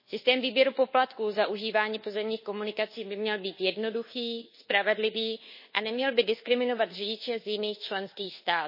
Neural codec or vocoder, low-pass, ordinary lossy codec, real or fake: codec, 24 kHz, 1.2 kbps, DualCodec; 5.4 kHz; MP3, 24 kbps; fake